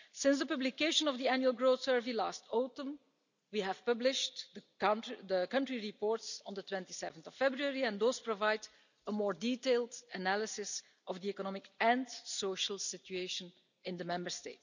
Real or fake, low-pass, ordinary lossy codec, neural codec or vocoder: real; 7.2 kHz; none; none